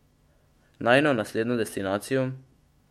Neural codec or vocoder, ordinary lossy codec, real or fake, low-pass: autoencoder, 48 kHz, 128 numbers a frame, DAC-VAE, trained on Japanese speech; MP3, 64 kbps; fake; 19.8 kHz